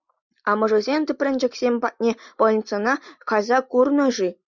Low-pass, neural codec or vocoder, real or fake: 7.2 kHz; none; real